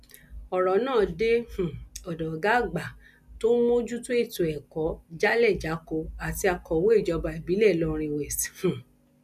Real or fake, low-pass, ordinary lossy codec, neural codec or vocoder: real; 14.4 kHz; none; none